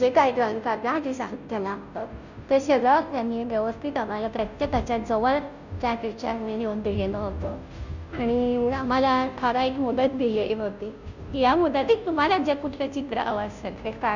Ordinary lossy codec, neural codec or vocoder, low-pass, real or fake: none; codec, 16 kHz, 0.5 kbps, FunCodec, trained on Chinese and English, 25 frames a second; 7.2 kHz; fake